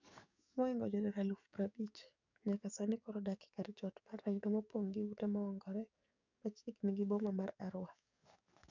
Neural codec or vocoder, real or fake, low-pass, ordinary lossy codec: codec, 44.1 kHz, 7.8 kbps, DAC; fake; 7.2 kHz; none